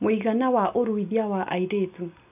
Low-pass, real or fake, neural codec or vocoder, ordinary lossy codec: 3.6 kHz; real; none; none